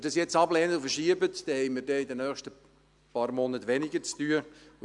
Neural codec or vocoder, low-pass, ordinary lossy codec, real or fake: none; 10.8 kHz; none; real